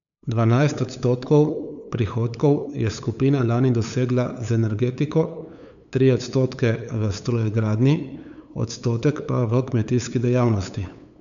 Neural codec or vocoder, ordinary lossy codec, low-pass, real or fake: codec, 16 kHz, 8 kbps, FunCodec, trained on LibriTTS, 25 frames a second; none; 7.2 kHz; fake